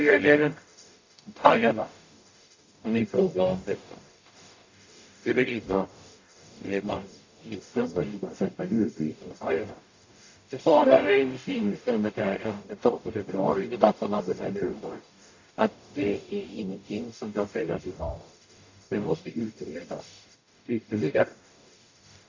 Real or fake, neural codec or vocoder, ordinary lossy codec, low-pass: fake; codec, 44.1 kHz, 0.9 kbps, DAC; AAC, 48 kbps; 7.2 kHz